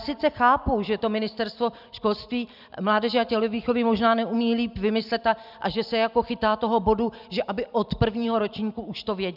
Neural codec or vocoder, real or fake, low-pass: none; real; 5.4 kHz